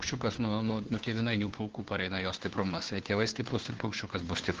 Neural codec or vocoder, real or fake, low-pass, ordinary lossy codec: codec, 16 kHz, 2 kbps, FunCodec, trained on Chinese and English, 25 frames a second; fake; 7.2 kHz; Opus, 16 kbps